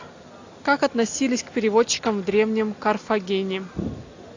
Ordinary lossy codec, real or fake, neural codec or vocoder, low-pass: AAC, 48 kbps; real; none; 7.2 kHz